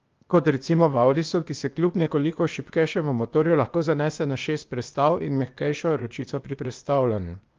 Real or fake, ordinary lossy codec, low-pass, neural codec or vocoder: fake; Opus, 32 kbps; 7.2 kHz; codec, 16 kHz, 0.8 kbps, ZipCodec